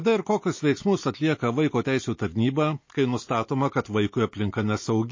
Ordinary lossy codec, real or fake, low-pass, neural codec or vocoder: MP3, 32 kbps; real; 7.2 kHz; none